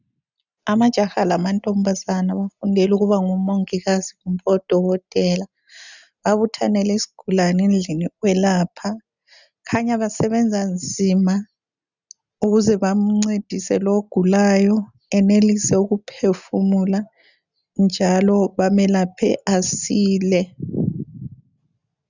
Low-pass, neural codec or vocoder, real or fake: 7.2 kHz; none; real